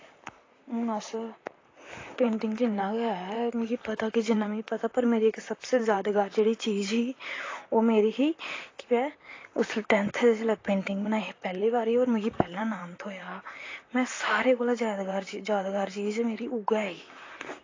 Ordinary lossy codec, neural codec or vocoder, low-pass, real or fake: AAC, 32 kbps; vocoder, 44.1 kHz, 128 mel bands, Pupu-Vocoder; 7.2 kHz; fake